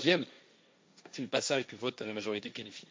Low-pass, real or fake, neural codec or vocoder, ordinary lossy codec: none; fake; codec, 16 kHz, 1.1 kbps, Voila-Tokenizer; none